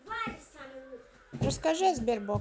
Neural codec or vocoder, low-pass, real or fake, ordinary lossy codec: none; none; real; none